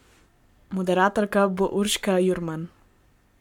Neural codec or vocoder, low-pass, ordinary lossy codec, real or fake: codec, 44.1 kHz, 7.8 kbps, Pupu-Codec; 19.8 kHz; MP3, 96 kbps; fake